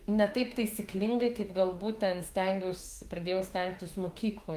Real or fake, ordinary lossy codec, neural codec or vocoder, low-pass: fake; Opus, 32 kbps; autoencoder, 48 kHz, 32 numbers a frame, DAC-VAE, trained on Japanese speech; 14.4 kHz